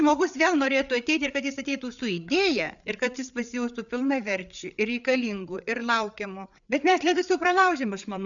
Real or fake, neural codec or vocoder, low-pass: fake; codec, 16 kHz, 8 kbps, FreqCodec, larger model; 7.2 kHz